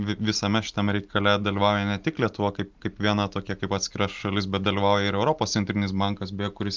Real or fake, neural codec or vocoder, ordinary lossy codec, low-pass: real; none; Opus, 32 kbps; 7.2 kHz